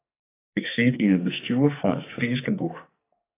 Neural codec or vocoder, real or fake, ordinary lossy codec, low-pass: codec, 44.1 kHz, 2.6 kbps, SNAC; fake; AAC, 16 kbps; 3.6 kHz